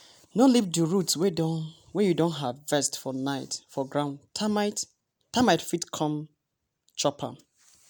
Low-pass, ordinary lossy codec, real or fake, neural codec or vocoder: none; none; real; none